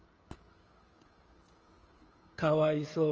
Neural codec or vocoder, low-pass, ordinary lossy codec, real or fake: codec, 16 kHz, 16 kbps, FreqCodec, smaller model; 7.2 kHz; Opus, 24 kbps; fake